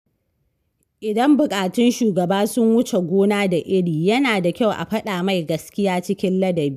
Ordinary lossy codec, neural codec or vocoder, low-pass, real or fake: none; none; 14.4 kHz; real